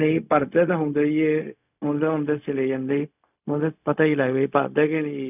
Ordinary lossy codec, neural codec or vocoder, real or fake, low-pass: none; codec, 16 kHz, 0.4 kbps, LongCat-Audio-Codec; fake; 3.6 kHz